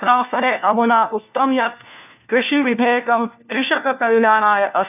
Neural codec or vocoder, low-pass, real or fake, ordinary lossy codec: codec, 16 kHz, 1 kbps, FunCodec, trained on LibriTTS, 50 frames a second; 3.6 kHz; fake; none